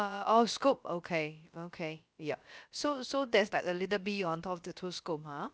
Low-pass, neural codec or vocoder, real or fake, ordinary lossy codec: none; codec, 16 kHz, about 1 kbps, DyCAST, with the encoder's durations; fake; none